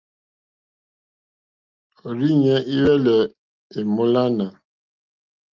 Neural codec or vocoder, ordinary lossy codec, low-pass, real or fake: none; Opus, 32 kbps; 7.2 kHz; real